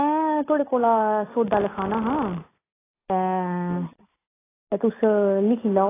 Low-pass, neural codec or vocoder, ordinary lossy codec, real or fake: 3.6 kHz; none; AAC, 16 kbps; real